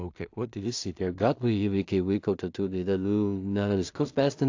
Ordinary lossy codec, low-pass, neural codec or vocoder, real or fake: AAC, 48 kbps; 7.2 kHz; codec, 16 kHz in and 24 kHz out, 0.4 kbps, LongCat-Audio-Codec, two codebook decoder; fake